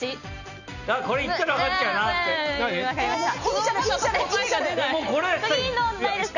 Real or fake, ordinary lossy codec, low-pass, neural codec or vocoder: real; none; 7.2 kHz; none